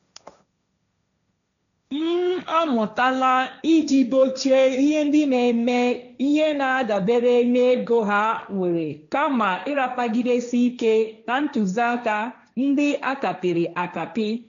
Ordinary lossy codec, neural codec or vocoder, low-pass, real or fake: none; codec, 16 kHz, 1.1 kbps, Voila-Tokenizer; 7.2 kHz; fake